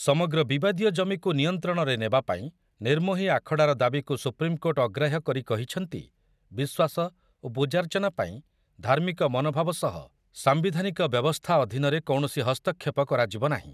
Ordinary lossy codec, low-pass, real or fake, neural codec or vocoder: none; 14.4 kHz; real; none